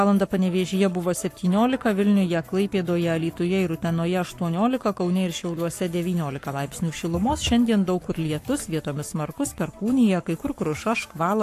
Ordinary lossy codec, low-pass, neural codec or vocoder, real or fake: AAC, 64 kbps; 14.4 kHz; codec, 44.1 kHz, 7.8 kbps, Pupu-Codec; fake